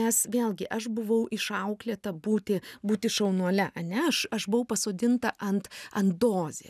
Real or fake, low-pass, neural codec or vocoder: real; 14.4 kHz; none